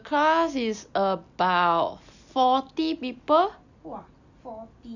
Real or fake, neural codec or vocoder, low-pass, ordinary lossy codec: real; none; 7.2 kHz; none